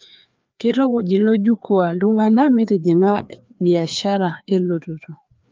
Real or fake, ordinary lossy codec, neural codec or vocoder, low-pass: fake; Opus, 24 kbps; codec, 16 kHz, 2 kbps, FreqCodec, larger model; 7.2 kHz